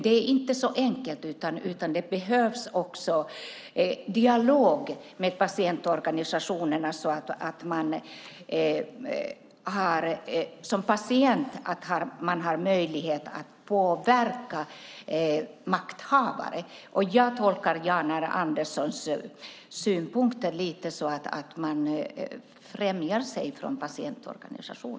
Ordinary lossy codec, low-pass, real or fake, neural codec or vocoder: none; none; real; none